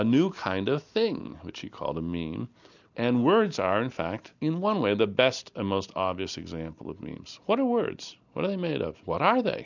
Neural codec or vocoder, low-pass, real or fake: none; 7.2 kHz; real